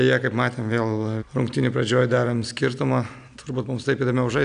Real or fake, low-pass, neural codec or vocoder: real; 9.9 kHz; none